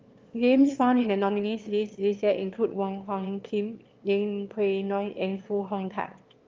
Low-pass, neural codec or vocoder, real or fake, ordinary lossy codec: 7.2 kHz; autoencoder, 22.05 kHz, a latent of 192 numbers a frame, VITS, trained on one speaker; fake; Opus, 32 kbps